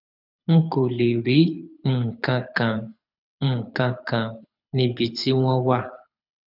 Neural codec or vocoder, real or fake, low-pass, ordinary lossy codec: codec, 24 kHz, 6 kbps, HILCodec; fake; 5.4 kHz; none